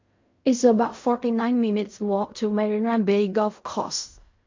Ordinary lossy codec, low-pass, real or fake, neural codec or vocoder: MP3, 48 kbps; 7.2 kHz; fake; codec, 16 kHz in and 24 kHz out, 0.4 kbps, LongCat-Audio-Codec, fine tuned four codebook decoder